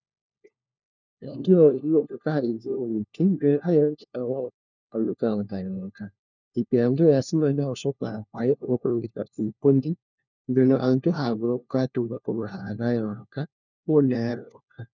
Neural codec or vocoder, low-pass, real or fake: codec, 16 kHz, 1 kbps, FunCodec, trained on LibriTTS, 50 frames a second; 7.2 kHz; fake